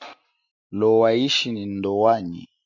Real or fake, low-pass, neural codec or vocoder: real; 7.2 kHz; none